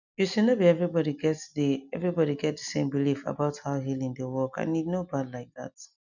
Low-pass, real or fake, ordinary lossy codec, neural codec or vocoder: 7.2 kHz; real; none; none